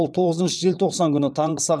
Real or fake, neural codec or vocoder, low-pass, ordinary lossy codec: fake; vocoder, 22.05 kHz, 80 mel bands, WaveNeXt; none; none